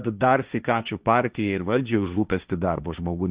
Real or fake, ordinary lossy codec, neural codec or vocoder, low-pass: fake; Opus, 64 kbps; codec, 16 kHz, 1.1 kbps, Voila-Tokenizer; 3.6 kHz